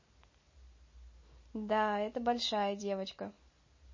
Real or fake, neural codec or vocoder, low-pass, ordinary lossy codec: real; none; 7.2 kHz; MP3, 32 kbps